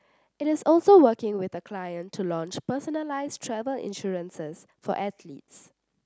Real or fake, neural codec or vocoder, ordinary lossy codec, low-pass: real; none; none; none